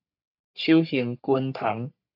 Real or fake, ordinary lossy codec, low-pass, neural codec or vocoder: fake; AAC, 48 kbps; 5.4 kHz; codec, 44.1 kHz, 3.4 kbps, Pupu-Codec